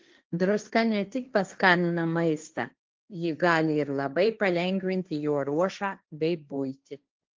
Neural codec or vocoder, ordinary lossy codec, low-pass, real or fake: codec, 16 kHz, 1.1 kbps, Voila-Tokenizer; Opus, 32 kbps; 7.2 kHz; fake